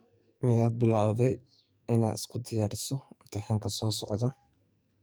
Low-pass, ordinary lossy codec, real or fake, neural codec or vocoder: none; none; fake; codec, 44.1 kHz, 2.6 kbps, SNAC